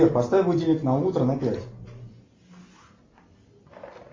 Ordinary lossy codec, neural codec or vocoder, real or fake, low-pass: MP3, 32 kbps; none; real; 7.2 kHz